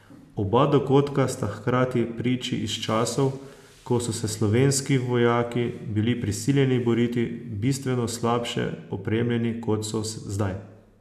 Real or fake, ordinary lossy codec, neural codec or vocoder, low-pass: real; none; none; 14.4 kHz